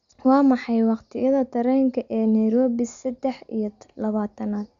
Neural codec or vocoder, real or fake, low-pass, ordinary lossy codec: none; real; 7.2 kHz; none